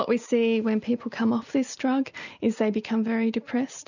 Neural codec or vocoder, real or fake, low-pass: none; real; 7.2 kHz